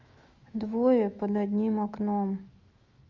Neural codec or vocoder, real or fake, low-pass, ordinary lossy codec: autoencoder, 48 kHz, 128 numbers a frame, DAC-VAE, trained on Japanese speech; fake; 7.2 kHz; Opus, 32 kbps